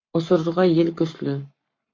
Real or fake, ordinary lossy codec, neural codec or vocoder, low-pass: fake; MP3, 64 kbps; codec, 44.1 kHz, 7.8 kbps, Pupu-Codec; 7.2 kHz